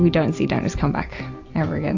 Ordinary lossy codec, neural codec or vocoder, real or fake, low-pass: AAC, 48 kbps; none; real; 7.2 kHz